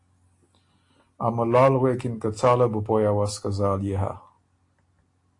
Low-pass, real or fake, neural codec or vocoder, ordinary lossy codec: 10.8 kHz; real; none; AAC, 48 kbps